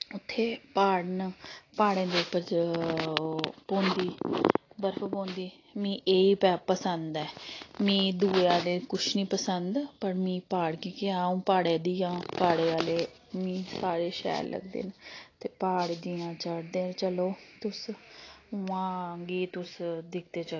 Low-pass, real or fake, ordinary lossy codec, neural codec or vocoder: 7.2 kHz; real; AAC, 32 kbps; none